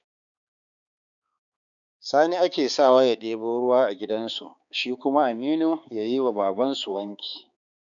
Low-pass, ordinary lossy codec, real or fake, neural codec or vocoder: 7.2 kHz; none; fake; codec, 16 kHz, 4 kbps, X-Codec, HuBERT features, trained on balanced general audio